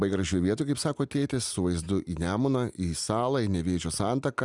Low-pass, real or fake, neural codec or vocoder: 10.8 kHz; real; none